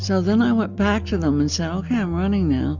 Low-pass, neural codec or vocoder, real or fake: 7.2 kHz; none; real